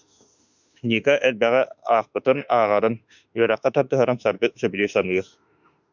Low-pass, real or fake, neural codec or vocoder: 7.2 kHz; fake; autoencoder, 48 kHz, 32 numbers a frame, DAC-VAE, trained on Japanese speech